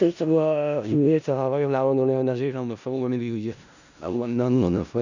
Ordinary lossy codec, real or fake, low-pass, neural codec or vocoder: none; fake; 7.2 kHz; codec, 16 kHz in and 24 kHz out, 0.4 kbps, LongCat-Audio-Codec, four codebook decoder